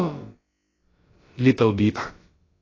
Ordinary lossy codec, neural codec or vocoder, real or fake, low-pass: AAC, 32 kbps; codec, 16 kHz, about 1 kbps, DyCAST, with the encoder's durations; fake; 7.2 kHz